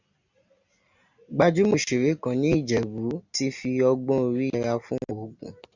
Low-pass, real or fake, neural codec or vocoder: 7.2 kHz; real; none